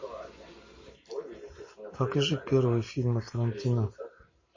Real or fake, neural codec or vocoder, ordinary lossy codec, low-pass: real; none; MP3, 32 kbps; 7.2 kHz